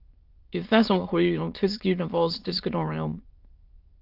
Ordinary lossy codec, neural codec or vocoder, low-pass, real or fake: Opus, 32 kbps; autoencoder, 22.05 kHz, a latent of 192 numbers a frame, VITS, trained on many speakers; 5.4 kHz; fake